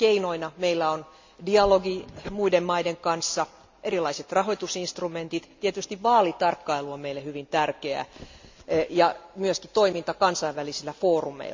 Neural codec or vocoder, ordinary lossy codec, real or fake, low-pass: none; MP3, 64 kbps; real; 7.2 kHz